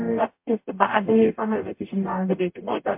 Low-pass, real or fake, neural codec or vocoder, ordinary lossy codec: 3.6 kHz; fake; codec, 44.1 kHz, 0.9 kbps, DAC; MP3, 32 kbps